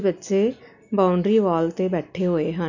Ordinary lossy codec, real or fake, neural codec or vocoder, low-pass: none; real; none; 7.2 kHz